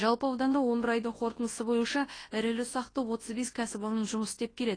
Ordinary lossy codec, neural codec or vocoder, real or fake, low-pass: AAC, 32 kbps; codec, 24 kHz, 0.9 kbps, WavTokenizer, large speech release; fake; 9.9 kHz